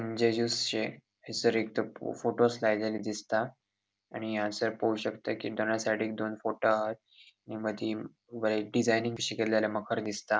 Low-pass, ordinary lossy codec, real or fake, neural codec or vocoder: none; none; real; none